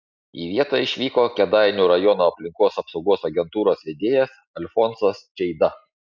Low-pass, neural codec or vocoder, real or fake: 7.2 kHz; none; real